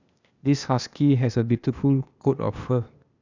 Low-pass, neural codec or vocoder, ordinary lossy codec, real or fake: 7.2 kHz; codec, 16 kHz, 0.8 kbps, ZipCodec; none; fake